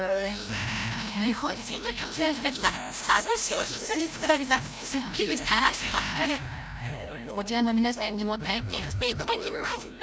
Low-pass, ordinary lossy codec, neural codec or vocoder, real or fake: none; none; codec, 16 kHz, 0.5 kbps, FreqCodec, larger model; fake